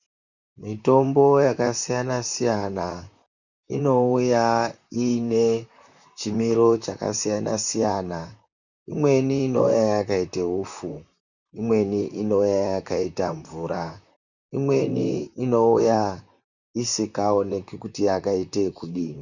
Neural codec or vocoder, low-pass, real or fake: vocoder, 44.1 kHz, 128 mel bands, Pupu-Vocoder; 7.2 kHz; fake